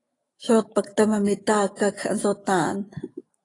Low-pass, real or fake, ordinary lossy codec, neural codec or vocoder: 10.8 kHz; fake; AAC, 32 kbps; autoencoder, 48 kHz, 128 numbers a frame, DAC-VAE, trained on Japanese speech